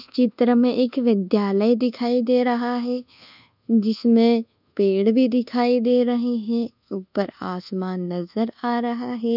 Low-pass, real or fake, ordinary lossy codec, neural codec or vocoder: 5.4 kHz; fake; none; codec, 24 kHz, 1.2 kbps, DualCodec